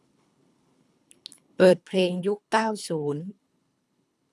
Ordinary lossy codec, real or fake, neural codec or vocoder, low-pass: none; fake; codec, 24 kHz, 3 kbps, HILCodec; none